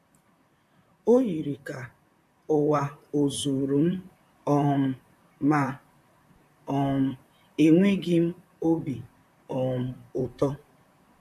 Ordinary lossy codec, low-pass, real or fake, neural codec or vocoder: AAC, 96 kbps; 14.4 kHz; fake; vocoder, 44.1 kHz, 128 mel bands, Pupu-Vocoder